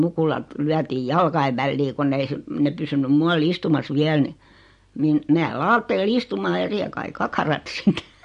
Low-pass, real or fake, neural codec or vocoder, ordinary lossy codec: 14.4 kHz; real; none; MP3, 48 kbps